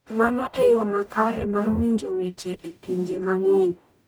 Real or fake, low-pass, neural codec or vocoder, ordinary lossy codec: fake; none; codec, 44.1 kHz, 0.9 kbps, DAC; none